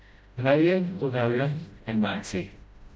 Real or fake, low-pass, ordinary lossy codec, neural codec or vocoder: fake; none; none; codec, 16 kHz, 0.5 kbps, FreqCodec, smaller model